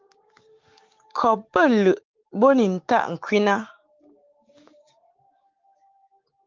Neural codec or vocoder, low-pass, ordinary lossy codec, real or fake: codec, 16 kHz, 6 kbps, DAC; 7.2 kHz; Opus, 32 kbps; fake